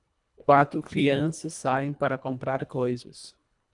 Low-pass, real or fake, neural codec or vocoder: 10.8 kHz; fake; codec, 24 kHz, 1.5 kbps, HILCodec